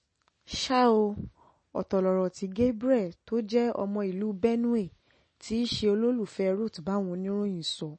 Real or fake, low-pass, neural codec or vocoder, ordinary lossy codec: real; 9.9 kHz; none; MP3, 32 kbps